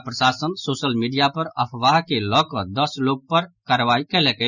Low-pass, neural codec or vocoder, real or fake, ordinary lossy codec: 7.2 kHz; none; real; none